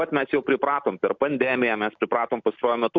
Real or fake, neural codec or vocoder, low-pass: real; none; 7.2 kHz